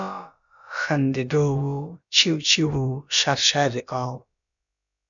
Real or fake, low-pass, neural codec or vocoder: fake; 7.2 kHz; codec, 16 kHz, about 1 kbps, DyCAST, with the encoder's durations